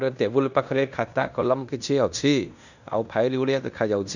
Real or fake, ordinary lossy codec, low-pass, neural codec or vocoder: fake; none; 7.2 kHz; codec, 16 kHz in and 24 kHz out, 0.9 kbps, LongCat-Audio-Codec, fine tuned four codebook decoder